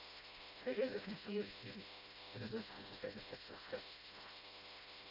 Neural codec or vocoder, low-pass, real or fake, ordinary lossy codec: codec, 16 kHz, 0.5 kbps, FreqCodec, smaller model; 5.4 kHz; fake; Opus, 64 kbps